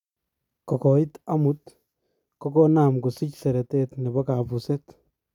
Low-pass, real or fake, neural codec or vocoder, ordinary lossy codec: 19.8 kHz; real; none; none